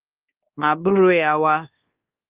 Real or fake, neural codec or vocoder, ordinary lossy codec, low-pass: fake; codec, 24 kHz, 1.2 kbps, DualCodec; Opus, 32 kbps; 3.6 kHz